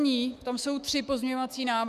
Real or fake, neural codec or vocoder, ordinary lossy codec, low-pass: fake; autoencoder, 48 kHz, 128 numbers a frame, DAC-VAE, trained on Japanese speech; Opus, 64 kbps; 14.4 kHz